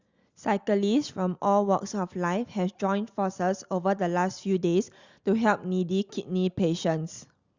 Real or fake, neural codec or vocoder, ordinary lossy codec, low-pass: real; none; Opus, 64 kbps; 7.2 kHz